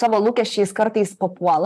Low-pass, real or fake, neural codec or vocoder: 14.4 kHz; fake; vocoder, 44.1 kHz, 128 mel bands, Pupu-Vocoder